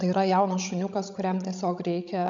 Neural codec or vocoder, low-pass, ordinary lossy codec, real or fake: codec, 16 kHz, 16 kbps, FreqCodec, larger model; 7.2 kHz; AAC, 64 kbps; fake